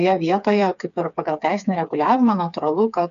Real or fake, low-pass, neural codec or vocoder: fake; 7.2 kHz; codec, 16 kHz, 4 kbps, FreqCodec, smaller model